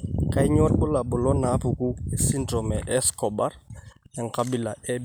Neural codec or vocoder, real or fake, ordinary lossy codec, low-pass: none; real; none; none